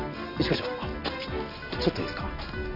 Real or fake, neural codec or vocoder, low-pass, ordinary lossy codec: real; none; 5.4 kHz; none